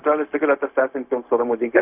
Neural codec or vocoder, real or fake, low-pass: codec, 16 kHz, 0.4 kbps, LongCat-Audio-Codec; fake; 3.6 kHz